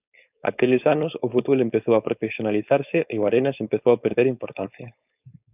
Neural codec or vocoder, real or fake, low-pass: codec, 16 kHz, 4.8 kbps, FACodec; fake; 3.6 kHz